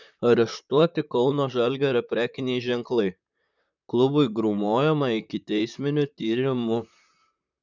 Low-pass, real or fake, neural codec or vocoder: 7.2 kHz; fake; vocoder, 44.1 kHz, 128 mel bands, Pupu-Vocoder